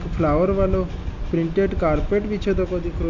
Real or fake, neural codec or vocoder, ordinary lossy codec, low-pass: real; none; none; 7.2 kHz